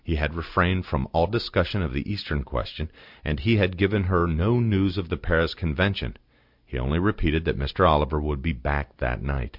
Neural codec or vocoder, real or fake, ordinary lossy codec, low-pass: none; real; MP3, 48 kbps; 5.4 kHz